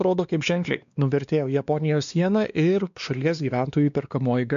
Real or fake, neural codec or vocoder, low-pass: fake; codec, 16 kHz, 2 kbps, X-Codec, WavLM features, trained on Multilingual LibriSpeech; 7.2 kHz